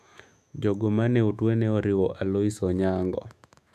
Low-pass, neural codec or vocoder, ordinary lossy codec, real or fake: 14.4 kHz; autoencoder, 48 kHz, 128 numbers a frame, DAC-VAE, trained on Japanese speech; none; fake